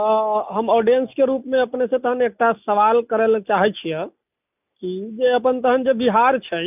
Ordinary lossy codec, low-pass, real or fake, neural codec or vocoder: none; 3.6 kHz; real; none